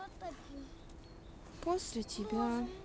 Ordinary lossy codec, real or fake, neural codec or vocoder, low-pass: none; real; none; none